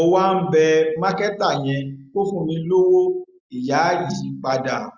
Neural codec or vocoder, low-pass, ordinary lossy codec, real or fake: none; 7.2 kHz; Opus, 64 kbps; real